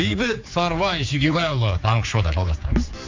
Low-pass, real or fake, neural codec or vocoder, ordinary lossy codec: 7.2 kHz; fake; codec, 16 kHz, 4 kbps, X-Codec, HuBERT features, trained on general audio; MP3, 48 kbps